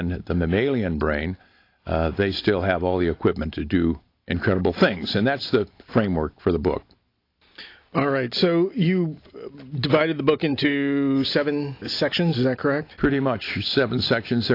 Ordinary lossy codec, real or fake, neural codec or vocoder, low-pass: AAC, 32 kbps; real; none; 5.4 kHz